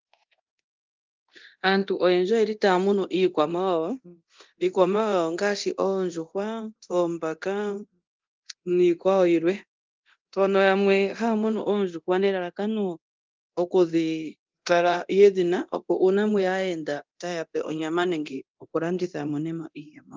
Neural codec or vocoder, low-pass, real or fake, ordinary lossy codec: codec, 24 kHz, 0.9 kbps, DualCodec; 7.2 kHz; fake; Opus, 32 kbps